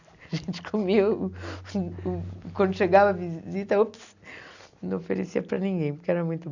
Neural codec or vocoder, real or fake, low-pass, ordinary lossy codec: none; real; 7.2 kHz; none